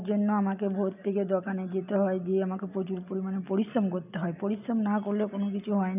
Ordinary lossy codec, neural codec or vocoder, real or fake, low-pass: none; none; real; 3.6 kHz